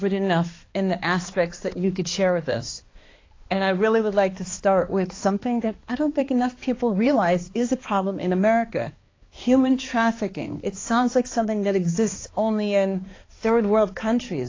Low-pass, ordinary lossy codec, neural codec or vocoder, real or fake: 7.2 kHz; AAC, 32 kbps; codec, 16 kHz, 2 kbps, X-Codec, HuBERT features, trained on balanced general audio; fake